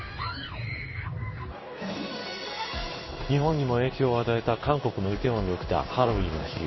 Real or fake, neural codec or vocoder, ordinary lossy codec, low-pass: fake; codec, 16 kHz in and 24 kHz out, 1 kbps, XY-Tokenizer; MP3, 24 kbps; 7.2 kHz